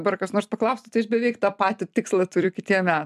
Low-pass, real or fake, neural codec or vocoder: 14.4 kHz; fake; vocoder, 44.1 kHz, 128 mel bands every 256 samples, BigVGAN v2